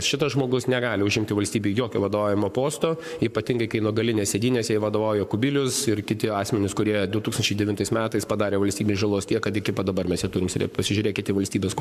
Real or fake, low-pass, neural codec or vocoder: fake; 14.4 kHz; codec, 44.1 kHz, 7.8 kbps, Pupu-Codec